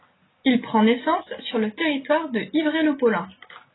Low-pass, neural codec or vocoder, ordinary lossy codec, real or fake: 7.2 kHz; none; AAC, 16 kbps; real